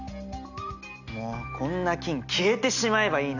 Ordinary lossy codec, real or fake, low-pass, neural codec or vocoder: none; real; 7.2 kHz; none